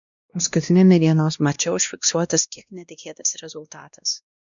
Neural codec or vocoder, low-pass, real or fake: codec, 16 kHz, 1 kbps, X-Codec, WavLM features, trained on Multilingual LibriSpeech; 7.2 kHz; fake